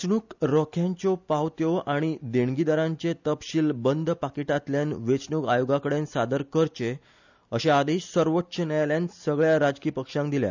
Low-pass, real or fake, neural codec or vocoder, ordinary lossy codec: 7.2 kHz; real; none; none